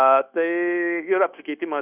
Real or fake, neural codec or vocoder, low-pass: fake; codec, 16 kHz, 0.9 kbps, LongCat-Audio-Codec; 3.6 kHz